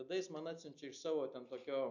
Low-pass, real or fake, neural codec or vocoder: 7.2 kHz; real; none